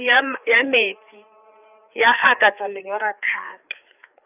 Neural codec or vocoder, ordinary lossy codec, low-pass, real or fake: codec, 16 kHz, 4 kbps, FreqCodec, larger model; none; 3.6 kHz; fake